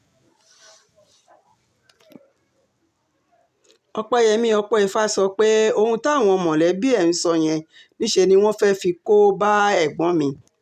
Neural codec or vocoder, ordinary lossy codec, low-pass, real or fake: none; none; 14.4 kHz; real